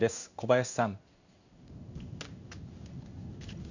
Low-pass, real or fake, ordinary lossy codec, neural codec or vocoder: 7.2 kHz; fake; none; codec, 16 kHz in and 24 kHz out, 1 kbps, XY-Tokenizer